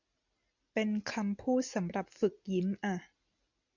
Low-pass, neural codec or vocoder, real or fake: 7.2 kHz; none; real